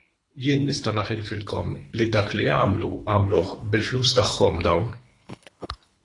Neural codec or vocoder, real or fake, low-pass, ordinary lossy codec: codec, 24 kHz, 3 kbps, HILCodec; fake; 10.8 kHz; AAC, 48 kbps